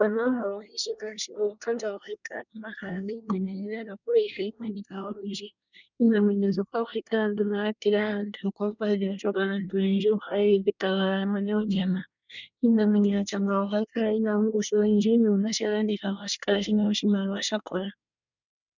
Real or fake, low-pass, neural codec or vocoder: fake; 7.2 kHz; codec, 24 kHz, 1 kbps, SNAC